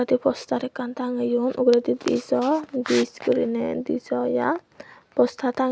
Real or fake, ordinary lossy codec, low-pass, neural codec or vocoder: real; none; none; none